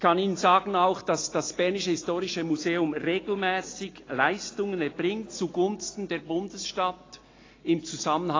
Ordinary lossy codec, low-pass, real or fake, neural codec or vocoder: AAC, 32 kbps; 7.2 kHz; real; none